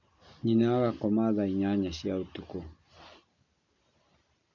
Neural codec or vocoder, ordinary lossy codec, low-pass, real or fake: none; none; 7.2 kHz; real